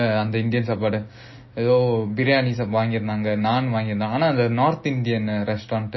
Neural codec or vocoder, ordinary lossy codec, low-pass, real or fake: none; MP3, 24 kbps; 7.2 kHz; real